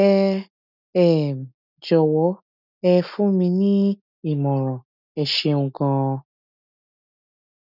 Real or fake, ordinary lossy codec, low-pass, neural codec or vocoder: real; none; 5.4 kHz; none